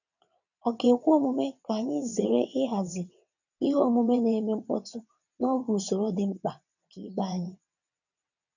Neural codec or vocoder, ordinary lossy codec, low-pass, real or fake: vocoder, 22.05 kHz, 80 mel bands, WaveNeXt; none; 7.2 kHz; fake